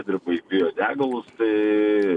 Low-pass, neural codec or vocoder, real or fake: 10.8 kHz; none; real